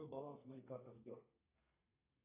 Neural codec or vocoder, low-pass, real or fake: codec, 32 kHz, 1.9 kbps, SNAC; 3.6 kHz; fake